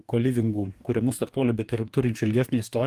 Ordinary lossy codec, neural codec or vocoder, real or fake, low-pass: Opus, 32 kbps; codec, 44.1 kHz, 2.6 kbps, DAC; fake; 14.4 kHz